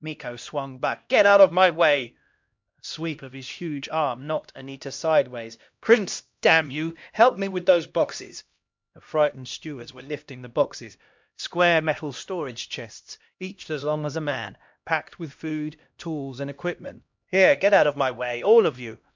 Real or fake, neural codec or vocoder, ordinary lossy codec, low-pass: fake; codec, 16 kHz, 1 kbps, X-Codec, HuBERT features, trained on LibriSpeech; MP3, 64 kbps; 7.2 kHz